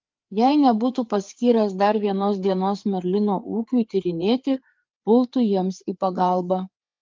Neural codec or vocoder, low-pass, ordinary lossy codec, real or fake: codec, 16 kHz, 4 kbps, FreqCodec, larger model; 7.2 kHz; Opus, 24 kbps; fake